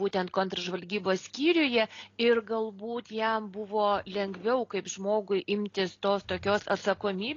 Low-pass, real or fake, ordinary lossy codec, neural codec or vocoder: 7.2 kHz; real; AAC, 32 kbps; none